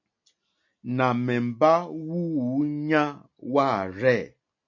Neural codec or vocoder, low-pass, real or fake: none; 7.2 kHz; real